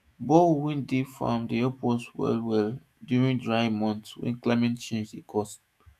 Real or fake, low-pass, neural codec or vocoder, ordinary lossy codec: fake; 14.4 kHz; autoencoder, 48 kHz, 128 numbers a frame, DAC-VAE, trained on Japanese speech; none